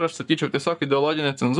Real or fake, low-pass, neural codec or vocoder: fake; 10.8 kHz; codec, 44.1 kHz, 7.8 kbps, Pupu-Codec